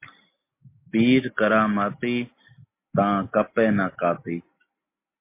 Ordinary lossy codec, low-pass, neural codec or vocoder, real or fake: MP3, 24 kbps; 3.6 kHz; none; real